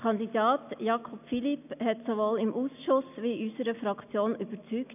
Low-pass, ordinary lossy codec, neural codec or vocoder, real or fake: 3.6 kHz; none; none; real